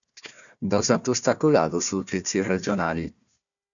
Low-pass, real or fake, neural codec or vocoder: 7.2 kHz; fake; codec, 16 kHz, 1 kbps, FunCodec, trained on Chinese and English, 50 frames a second